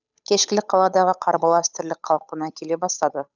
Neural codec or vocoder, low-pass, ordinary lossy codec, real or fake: codec, 16 kHz, 8 kbps, FunCodec, trained on Chinese and English, 25 frames a second; 7.2 kHz; none; fake